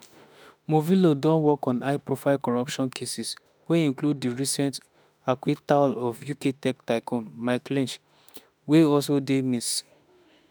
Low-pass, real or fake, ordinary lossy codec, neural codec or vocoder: none; fake; none; autoencoder, 48 kHz, 32 numbers a frame, DAC-VAE, trained on Japanese speech